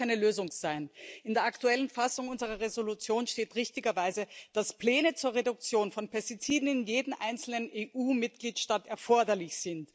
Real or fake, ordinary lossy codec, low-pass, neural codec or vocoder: real; none; none; none